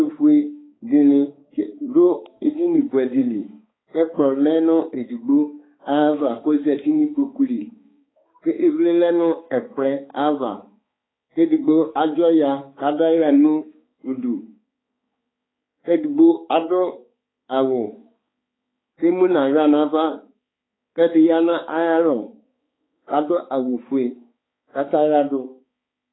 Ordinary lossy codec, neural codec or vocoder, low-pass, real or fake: AAC, 16 kbps; codec, 16 kHz, 4 kbps, X-Codec, HuBERT features, trained on balanced general audio; 7.2 kHz; fake